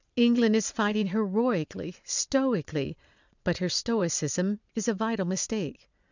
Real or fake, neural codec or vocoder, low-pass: fake; vocoder, 22.05 kHz, 80 mel bands, Vocos; 7.2 kHz